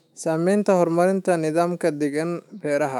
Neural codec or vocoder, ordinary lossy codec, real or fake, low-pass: autoencoder, 48 kHz, 128 numbers a frame, DAC-VAE, trained on Japanese speech; none; fake; 19.8 kHz